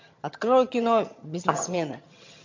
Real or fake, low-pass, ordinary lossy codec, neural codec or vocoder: fake; 7.2 kHz; MP3, 48 kbps; vocoder, 22.05 kHz, 80 mel bands, HiFi-GAN